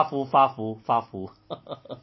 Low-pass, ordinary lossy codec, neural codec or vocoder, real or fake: 7.2 kHz; MP3, 24 kbps; none; real